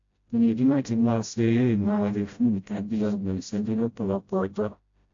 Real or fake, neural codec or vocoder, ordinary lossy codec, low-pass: fake; codec, 16 kHz, 0.5 kbps, FreqCodec, smaller model; AAC, 48 kbps; 7.2 kHz